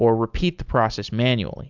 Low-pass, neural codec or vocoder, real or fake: 7.2 kHz; none; real